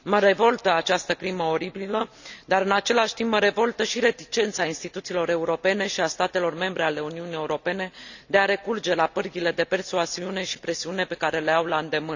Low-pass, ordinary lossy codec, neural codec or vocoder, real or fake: 7.2 kHz; none; none; real